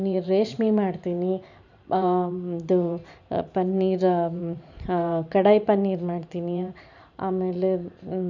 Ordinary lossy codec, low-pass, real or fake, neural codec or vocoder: none; 7.2 kHz; fake; vocoder, 44.1 kHz, 128 mel bands every 512 samples, BigVGAN v2